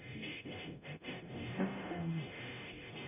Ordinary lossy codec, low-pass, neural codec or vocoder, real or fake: none; 3.6 kHz; codec, 44.1 kHz, 0.9 kbps, DAC; fake